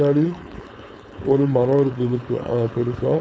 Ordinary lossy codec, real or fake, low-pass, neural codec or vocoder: none; fake; none; codec, 16 kHz, 4.8 kbps, FACodec